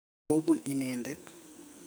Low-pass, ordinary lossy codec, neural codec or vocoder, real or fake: none; none; codec, 44.1 kHz, 2.6 kbps, SNAC; fake